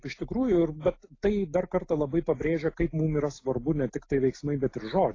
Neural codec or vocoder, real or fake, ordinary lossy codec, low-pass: none; real; AAC, 32 kbps; 7.2 kHz